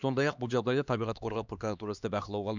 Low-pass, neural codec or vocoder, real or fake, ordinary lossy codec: 7.2 kHz; codec, 16 kHz, 2 kbps, X-Codec, HuBERT features, trained on LibriSpeech; fake; none